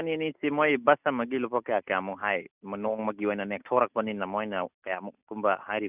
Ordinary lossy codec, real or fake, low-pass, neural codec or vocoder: none; real; 3.6 kHz; none